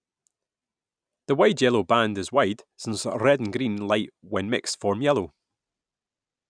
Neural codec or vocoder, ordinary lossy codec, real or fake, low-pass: none; none; real; 9.9 kHz